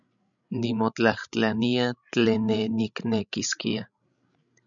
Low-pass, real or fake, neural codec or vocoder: 7.2 kHz; fake; codec, 16 kHz, 16 kbps, FreqCodec, larger model